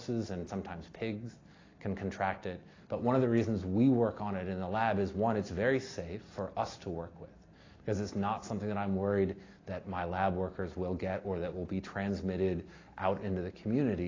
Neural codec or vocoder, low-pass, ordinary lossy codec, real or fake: none; 7.2 kHz; AAC, 32 kbps; real